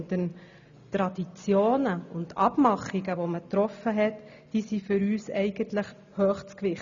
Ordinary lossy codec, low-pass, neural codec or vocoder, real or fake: none; 7.2 kHz; none; real